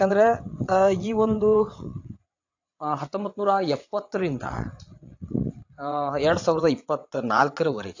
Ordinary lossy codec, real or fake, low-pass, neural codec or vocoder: AAC, 48 kbps; fake; 7.2 kHz; vocoder, 22.05 kHz, 80 mel bands, WaveNeXt